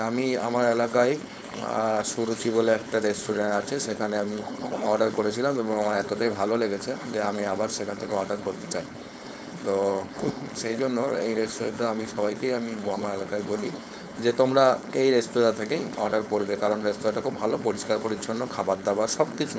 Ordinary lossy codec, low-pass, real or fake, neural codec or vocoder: none; none; fake; codec, 16 kHz, 4.8 kbps, FACodec